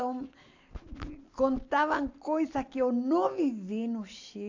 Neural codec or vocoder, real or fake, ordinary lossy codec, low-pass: none; real; AAC, 48 kbps; 7.2 kHz